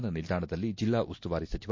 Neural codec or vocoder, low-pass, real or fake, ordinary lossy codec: none; 7.2 kHz; real; MP3, 48 kbps